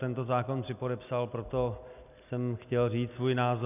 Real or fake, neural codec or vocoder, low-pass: real; none; 3.6 kHz